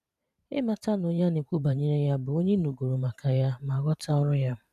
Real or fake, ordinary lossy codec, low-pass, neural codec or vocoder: fake; none; 14.4 kHz; vocoder, 44.1 kHz, 128 mel bands every 512 samples, BigVGAN v2